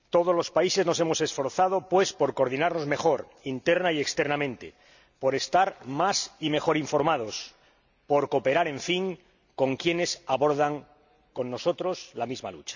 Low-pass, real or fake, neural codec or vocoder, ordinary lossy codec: 7.2 kHz; real; none; none